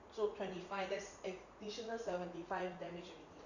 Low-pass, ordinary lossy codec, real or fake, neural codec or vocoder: 7.2 kHz; none; fake; vocoder, 44.1 kHz, 80 mel bands, Vocos